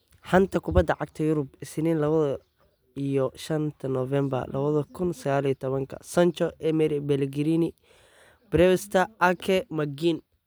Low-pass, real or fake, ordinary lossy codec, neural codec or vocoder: none; real; none; none